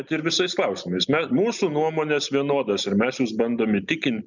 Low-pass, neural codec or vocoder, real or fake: 7.2 kHz; none; real